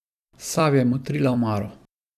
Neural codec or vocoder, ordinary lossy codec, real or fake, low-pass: vocoder, 44.1 kHz, 128 mel bands every 256 samples, BigVGAN v2; none; fake; 14.4 kHz